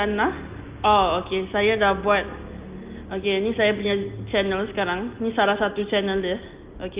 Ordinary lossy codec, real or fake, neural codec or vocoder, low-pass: Opus, 32 kbps; real; none; 3.6 kHz